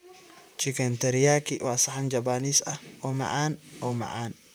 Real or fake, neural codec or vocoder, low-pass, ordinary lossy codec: fake; vocoder, 44.1 kHz, 128 mel bands, Pupu-Vocoder; none; none